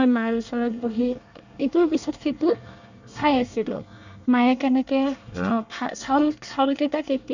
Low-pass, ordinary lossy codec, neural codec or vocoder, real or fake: 7.2 kHz; none; codec, 24 kHz, 1 kbps, SNAC; fake